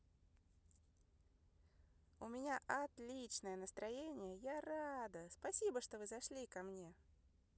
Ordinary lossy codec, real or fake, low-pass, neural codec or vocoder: none; real; none; none